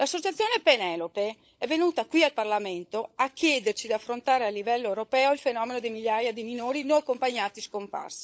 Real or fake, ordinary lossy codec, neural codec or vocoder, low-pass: fake; none; codec, 16 kHz, 16 kbps, FunCodec, trained on LibriTTS, 50 frames a second; none